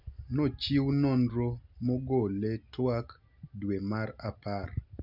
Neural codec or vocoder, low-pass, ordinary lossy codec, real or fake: none; 5.4 kHz; none; real